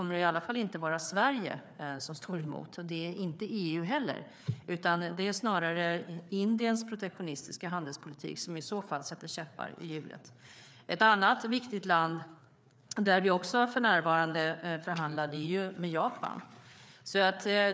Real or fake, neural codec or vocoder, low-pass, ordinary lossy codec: fake; codec, 16 kHz, 4 kbps, FreqCodec, larger model; none; none